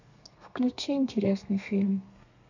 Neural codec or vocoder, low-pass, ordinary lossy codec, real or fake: codec, 32 kHz, 1.9 kbps, SNAC; 7.2 kHz; none; fake